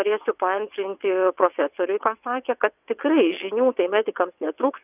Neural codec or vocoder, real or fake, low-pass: vocoder, 22.05 kHz, 80 mel bands, WaveNeXt; fake; 3.6 kHz